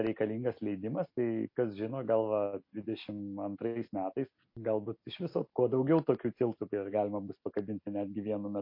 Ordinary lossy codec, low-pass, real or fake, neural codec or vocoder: MP3, 32 kbps; 5.4 kHz; real; none